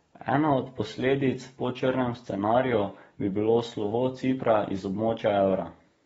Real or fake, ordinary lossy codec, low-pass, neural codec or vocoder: fake; AAC, 24 kbps; 19.8 kHz; codec, 44.1 kHz, 7.8 kbps, DAC